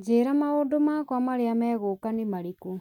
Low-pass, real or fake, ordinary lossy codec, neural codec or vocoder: 19.8 kHz; real; none; none